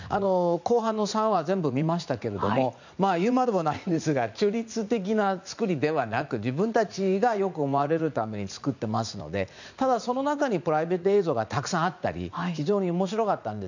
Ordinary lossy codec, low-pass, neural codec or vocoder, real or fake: none; 7.2 kHz; vocoder, 44.1 kHz, 80 mel bands, Vocos; fake